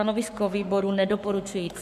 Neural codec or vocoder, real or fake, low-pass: codec, 44.1 kHz, 7.8 kbps, Pupu-Codec; fake; 14.4 kHz